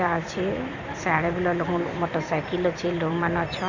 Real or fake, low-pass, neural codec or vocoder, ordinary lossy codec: fake; 7.2 kHz; vocoder, 44.1 kHz, 128 mel bands every 256 samples, BigVGAN v2; none